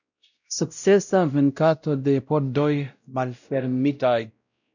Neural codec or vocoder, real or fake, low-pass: codec, 16 kHz, 0.5 kbps, X-Codec, WavLM features, trained on Multilingual LibriSpeech; fake; 7.2 kHz